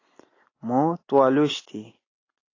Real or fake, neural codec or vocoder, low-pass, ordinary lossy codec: real; none; 7.2 kHz; AAC, 32 kbps